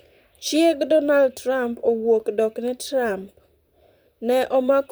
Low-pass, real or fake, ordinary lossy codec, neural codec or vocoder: none; fake; none; vocoder, 44.1 kHz, 128 mel bands, Pupu-Vocoder